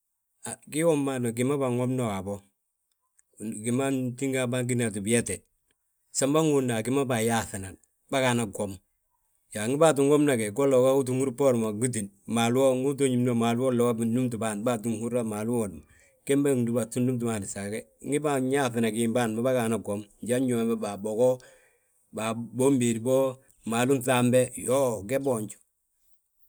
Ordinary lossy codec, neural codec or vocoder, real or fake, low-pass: none; none; real; none